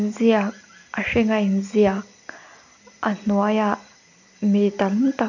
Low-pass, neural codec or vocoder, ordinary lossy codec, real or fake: 7.2 kHz; none; none; real